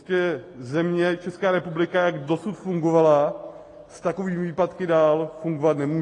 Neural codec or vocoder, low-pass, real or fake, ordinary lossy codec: none; 10.8 kHz; real; AAC, 32 kbps